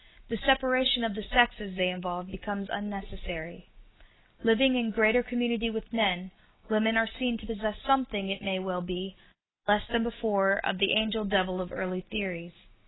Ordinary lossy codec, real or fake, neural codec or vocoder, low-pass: AAC, 16 kbps; real; none; 7.2 kHz